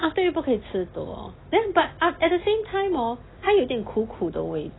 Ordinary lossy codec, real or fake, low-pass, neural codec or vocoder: AAC, 16 kbps; real; 7.2 kHz; none